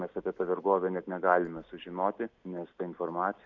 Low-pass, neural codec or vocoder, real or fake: 7.2 kHz; none; real